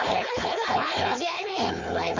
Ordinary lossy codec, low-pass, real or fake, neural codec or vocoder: MP3, 48 kbps; 7.2 kHz; fake; codec, 16 kHz, 4.8 kbps, FACodec